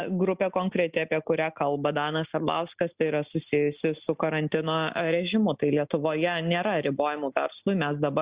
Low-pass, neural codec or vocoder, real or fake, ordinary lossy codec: 3.6 kHz; none; real; Opus, 64 kbps